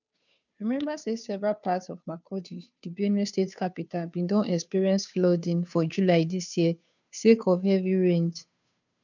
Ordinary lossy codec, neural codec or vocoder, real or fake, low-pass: none; codec, 16 kHz, 2 kbps, FunCodec, trained on Chinese and English, 25 frames a second; fake; 7.2 kHz